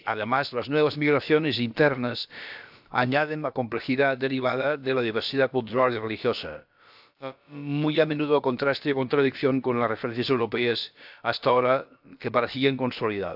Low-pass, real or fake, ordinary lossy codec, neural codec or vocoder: 5.4 kHz; fake; AAC, 48 kbps; codec, 16 kHz, about 1 kbps, DyCAST, with the encoder's durations